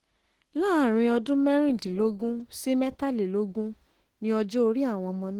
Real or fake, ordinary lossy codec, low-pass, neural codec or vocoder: fake; Opus, 16 kbps; 19.8 kHz; autoencoder, 48 kHz, 32 numbers a frame, DAC-VAE, trained on Japanese speech